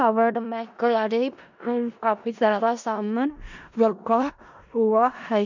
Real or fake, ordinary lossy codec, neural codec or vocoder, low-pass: fake; none; codec, 16 kHz in and 24 kHz out, 0.4 kbps, LongCat-Audio-Codec, four codebook decoder; 7.2 kHz